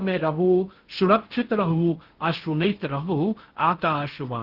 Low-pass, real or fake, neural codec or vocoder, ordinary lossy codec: 5.4 kHz; fake; codec, 16 kHz in and 24 kHz out, 0.6 kbps, FocalCodec, streaming, 2048 codes; Opus, 16 kbps